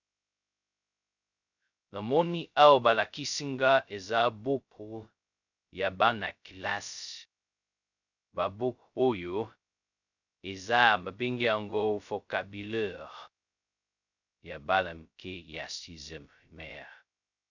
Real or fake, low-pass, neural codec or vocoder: fake; 7.2 kHz; codec, 16 kHz, 0.2 kbps, FocalCodec